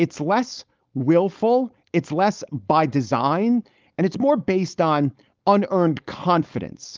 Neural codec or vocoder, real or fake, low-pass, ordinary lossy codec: codec, 16 kHz, 4.8 kbps, FACodec; fake; 7.2 kHz; Opus, 24 kbps